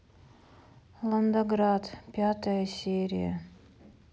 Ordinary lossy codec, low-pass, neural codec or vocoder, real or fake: none; none; none; real